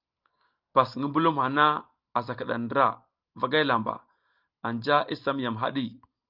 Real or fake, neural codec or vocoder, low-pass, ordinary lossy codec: real; none; 5.4 kHz; Opus, 24 kbps